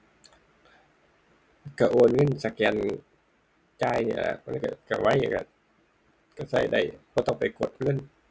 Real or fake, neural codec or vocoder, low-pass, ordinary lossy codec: real; none; none; none